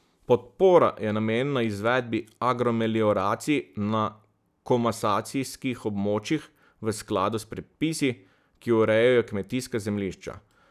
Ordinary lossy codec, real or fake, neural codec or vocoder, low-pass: none; real; none; 14.4 kHz